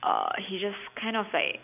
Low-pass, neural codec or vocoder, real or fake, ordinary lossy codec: 3.6 kHz; none; real; none